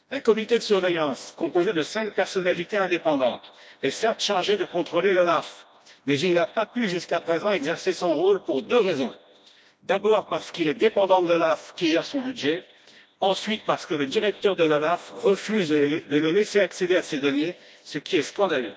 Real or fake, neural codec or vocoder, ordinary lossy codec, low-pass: fake; codec, 16 kHz, 1 kbps, FreqCodec, smaller model; none; none